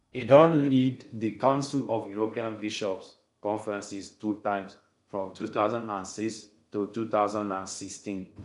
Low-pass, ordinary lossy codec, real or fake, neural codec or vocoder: 10.8 kHz; none; fake; codec, 16 kHz in and 24 kHz out, 0.6 kbps, FocalCodec, streaming, 2048 codes